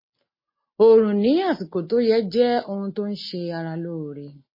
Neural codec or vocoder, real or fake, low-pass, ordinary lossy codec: none; real; 5.4 kHz; MP3, 24 kbps